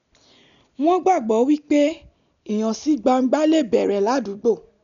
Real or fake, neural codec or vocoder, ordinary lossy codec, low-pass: real; none; none; 7.2 kHz